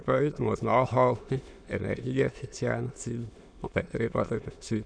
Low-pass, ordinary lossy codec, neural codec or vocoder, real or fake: 9.9 kHz; none; autoencoder, 22.05 kHz, a latent of 192 numbers a frame, VITS, trained on many speakers; fake